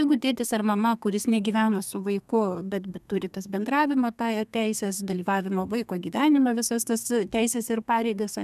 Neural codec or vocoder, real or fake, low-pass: codec, 32 kHz, 1.9 kbps, SNAC; fake; 14.4 kHz